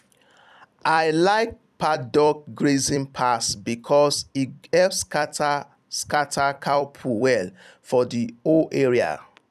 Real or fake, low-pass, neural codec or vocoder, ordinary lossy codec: fake; 14.4 kHz; vocoder, 44.1 kHz, 128 mel bands every 256 samples, BigVGAN v2; none